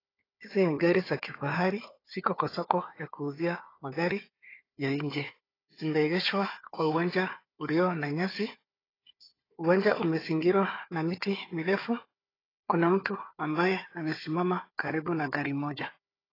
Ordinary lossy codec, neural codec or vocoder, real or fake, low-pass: AAC, 24 kbps; codec, 16 kHz, 4 kbps, FunCodec, trained on Chinese and English, 50 frames a second; fake; 5.4 kHz